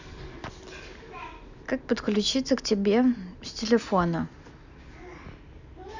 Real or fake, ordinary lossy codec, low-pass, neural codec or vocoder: real; none; 7.2 kHz; none